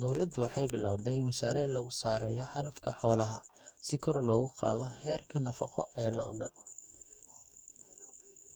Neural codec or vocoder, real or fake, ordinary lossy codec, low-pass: codec, 44.1 kHz, 2.6 kbps, DAC; fake; none; 19.8 kHz